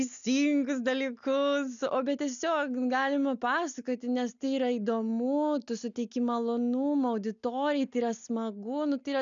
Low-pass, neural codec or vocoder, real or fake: 7.2 kHz; none; real